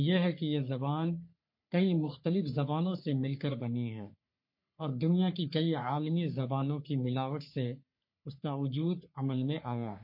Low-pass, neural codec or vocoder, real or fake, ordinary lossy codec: 5.4 kHz; codec, 44.1 kHz, 3.4 kbps, Pupu-Codec; fake; MP3, 32 kbps